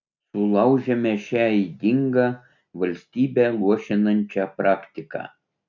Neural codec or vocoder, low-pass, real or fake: none; 7.2 kHz; real